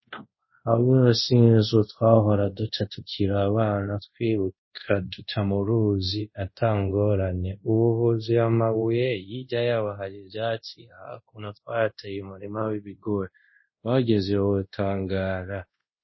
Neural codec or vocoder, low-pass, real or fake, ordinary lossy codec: codec, 24 kHz, 0.5 kbps, DualCodec; 7.2 kHz; fake; MP3, 24 kbps